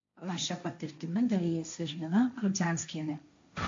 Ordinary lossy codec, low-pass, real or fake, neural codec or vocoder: AAC, 48 kbps; 7.2 kHz; fake; codec, 16 kHz, 1.1 kbps, Voila-Tokenizer